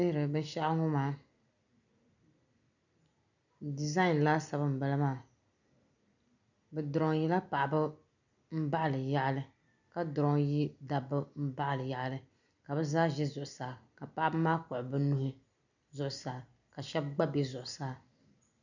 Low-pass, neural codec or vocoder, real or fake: 7.2 kHz; none; real